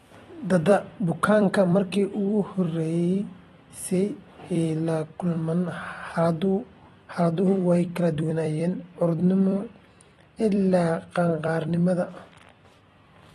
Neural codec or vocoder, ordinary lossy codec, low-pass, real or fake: vocoder, 44.1 kHz, 128 mel bands every 256 samples, BigVGAN v2; AAC, 32 kbps; 19.8 kHz; fake